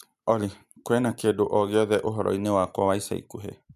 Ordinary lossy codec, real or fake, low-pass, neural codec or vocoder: MP3, 96 kbps; real; 14.4 kHz; none